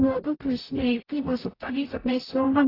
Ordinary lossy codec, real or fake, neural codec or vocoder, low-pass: AAC, 24 kbps; fake; codec, 44.1 kHz, 0.9 kbps, DAC; 5.4 kHz